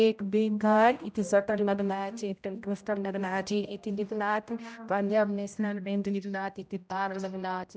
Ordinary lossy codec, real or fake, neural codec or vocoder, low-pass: none; fake; codec, 16 kHz, 0.5 kbps, X-Codec, HuBERT features, trained on general audio; none